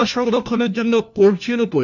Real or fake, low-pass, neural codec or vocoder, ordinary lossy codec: fake; 7.2 kHz; codec, 16 kHz, 1 kbps, FunCodec, trained on LibriTTS, 50 frames a second; none